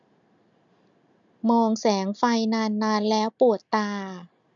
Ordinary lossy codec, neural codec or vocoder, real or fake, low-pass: none; none; real; 7.2 kHz